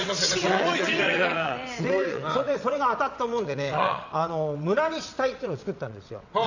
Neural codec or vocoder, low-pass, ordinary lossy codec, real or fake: vocoder, 22.05 kHz, 80 mel bands, WaveNeXt; 7.2 kHz; none; fake